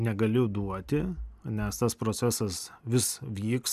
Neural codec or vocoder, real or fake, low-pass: none; real; 14.4 kHz